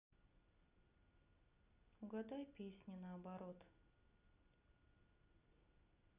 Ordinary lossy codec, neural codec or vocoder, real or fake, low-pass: none; none; real; 3.6 kHz